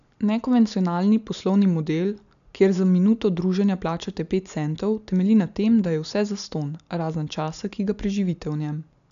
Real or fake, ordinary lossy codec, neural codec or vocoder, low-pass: real; none; none; 7.2 kHz